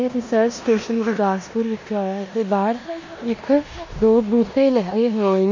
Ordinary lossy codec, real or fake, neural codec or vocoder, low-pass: MP3, 64 kbps; fake; codec, 16 kHz in and 24 kHz out, 0.9 kbps, LongCat-Audio-Codec, four codebook decoder; 7.2 kHz